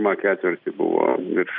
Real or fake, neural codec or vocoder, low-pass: real; none; 5.4 kHz